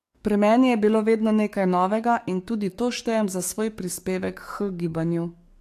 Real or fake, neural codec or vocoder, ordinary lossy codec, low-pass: fake; codec, 44.1 kHz, 7.8 kbps, DAC; AAC, 64 kbps; 14.4 kHz